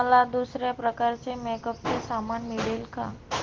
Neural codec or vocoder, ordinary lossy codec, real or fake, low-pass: none; Opus, 16 kbps; real; 7.2 kHz